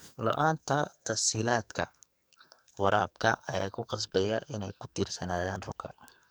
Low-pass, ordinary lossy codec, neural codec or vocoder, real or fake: none; none; codec, 44.1 kHz, 2.6 kbps, SNAC; fake